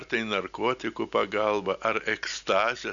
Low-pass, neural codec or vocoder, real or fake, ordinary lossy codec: 7.2 kHz; none; real; MP3, 96 kbps